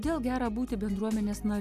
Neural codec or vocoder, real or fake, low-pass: none; real; 14.4 kHz